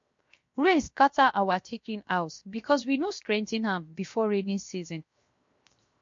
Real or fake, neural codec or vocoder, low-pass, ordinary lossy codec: fake; codec, 16 kHz, 0.7 kbps, FocalCodec; 7.2 kHz; MP3, 48 kbps